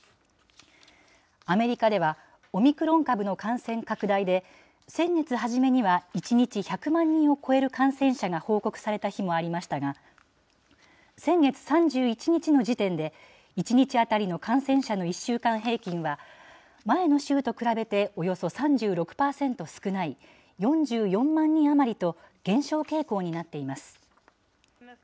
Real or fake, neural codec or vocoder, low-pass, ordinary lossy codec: real; none; none; none